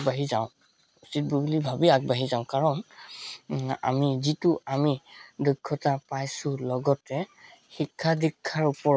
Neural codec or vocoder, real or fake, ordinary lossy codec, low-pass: none; real; none; none